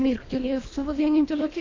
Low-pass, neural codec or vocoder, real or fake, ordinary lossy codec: 7.2 kHz; codec, 24 kHz, 1.5 kbps, HILCodec; fake; AAC, 32 kbps